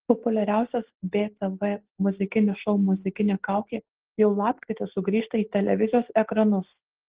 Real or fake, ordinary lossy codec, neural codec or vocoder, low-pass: real; Opus, 16 kbps; none; 3.6 kHz